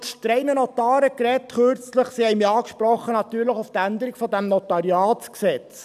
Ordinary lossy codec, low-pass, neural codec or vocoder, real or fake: none; 14.4 kHz; none; real